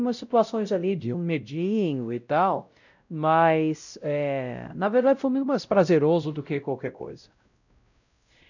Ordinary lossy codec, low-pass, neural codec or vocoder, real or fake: none; 7.2 kHz; codec, 16 kHz, 0.5 kbps, X-Codec, WavLM features, trained on Multilingual LibriSpeech; fake